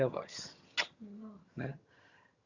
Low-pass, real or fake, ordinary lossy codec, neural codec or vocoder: 7.2 kHz; fake; Opus, 64 kbps; vocoder, 22.05 kHz, 80 mel bands, HiFi-GAN